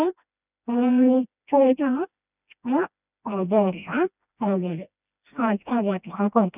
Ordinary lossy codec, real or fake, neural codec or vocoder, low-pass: none; fake; codec, 16 kHz, 1 kbps, FreqCodec, smaller model; 3.6 kHz